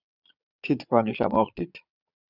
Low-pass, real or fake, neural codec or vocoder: 5.4 kHz; fake; vocoder, 22.05 kHz, 80 mel bands, Vocos